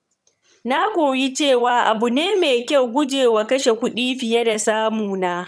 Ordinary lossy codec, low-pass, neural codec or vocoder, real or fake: none; none; vocoder, 22.05 kHz, 80 mel bands, HiFi-GAN; fake